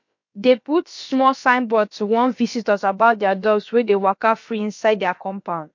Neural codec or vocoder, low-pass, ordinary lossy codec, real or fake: codec, 16 kHz, about 1 kbps, DyCAST, with the encoder's durations; 7.2 kHz; MP3, 48 kbps; fake